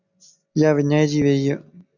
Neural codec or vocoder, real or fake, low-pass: none; real; 7.2 kHz